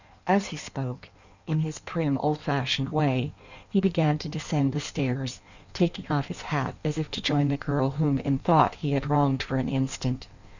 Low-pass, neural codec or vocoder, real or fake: 7.2 kHz; codec, 16 kHz in and 24 kHz out, 1.1 kbps, FireRedTTS-2 codec; fake